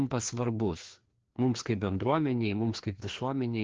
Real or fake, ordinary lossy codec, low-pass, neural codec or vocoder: fake; Opus, 16 kbps; 7.2 kHz; codec, 16 kHz, 2 kbps, FreqCodec, larger model